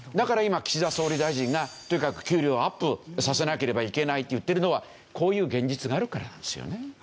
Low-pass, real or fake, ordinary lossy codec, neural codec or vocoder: none; real; none; none